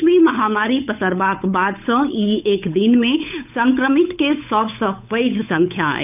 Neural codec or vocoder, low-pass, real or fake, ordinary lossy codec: codec, 16 kHz, 8 kbps, FunCodec, trained on Chinese and English, 25 frames a second; 3.6 kHz; fake; none